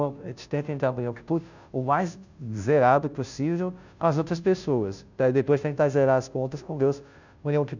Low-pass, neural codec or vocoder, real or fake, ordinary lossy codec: 7.2 kHz; codec, 16 kHz, 0.5 kbps, FunCodec, trained on Chinese and English, 25 frames a second; fake; none